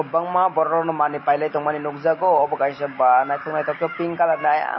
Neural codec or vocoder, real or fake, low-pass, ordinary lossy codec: none; real; 7.2 kHz; MP3, 24 kbps